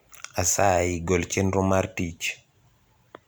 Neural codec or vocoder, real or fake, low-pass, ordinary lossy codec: none; real; none; none